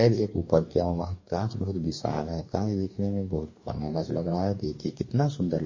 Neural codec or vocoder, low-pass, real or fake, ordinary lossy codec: codec, 44.1 kHz, 2.6 kbps, DAC; 7.2 kHz; fake; MP3, 32 kbps